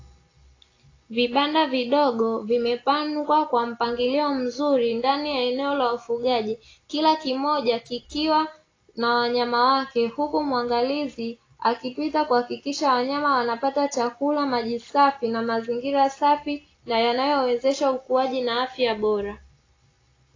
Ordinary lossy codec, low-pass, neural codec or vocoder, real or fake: AAC, 32 kbps; 7.2 kHz; none; real